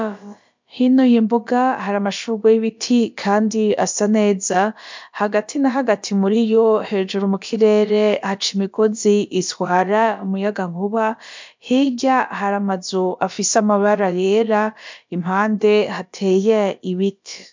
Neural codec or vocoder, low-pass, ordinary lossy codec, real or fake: codec, 16 kHz, about 1 kbps, DyCAST, with the encoder's durations; 7.2 kHz; MP3, 64 kbps; fake